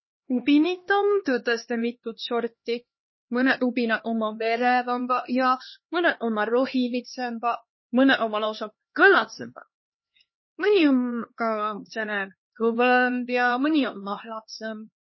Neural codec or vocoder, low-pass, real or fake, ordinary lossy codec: codec, 16 kHz, 2 kbps, X-Codec, HuBERT features, trained on LibriSpeech; 7.2 kHz; fake; MP3, 24 kbps